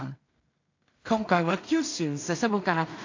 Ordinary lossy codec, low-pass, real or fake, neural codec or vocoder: AAC, 48 kbps; 7.2 kHz; fake; codec, 16 kHz in and 24 kHz out, 0.4 kbps, LongCat-Audio-Codec, two codebook decoder